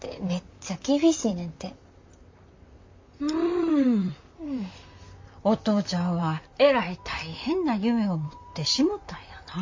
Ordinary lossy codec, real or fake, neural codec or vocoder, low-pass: MP3, 48 kbps; fake; vocoder, 44.1 kHz, 128 mel bands, Pupu-Vocoder; 7.2 kHz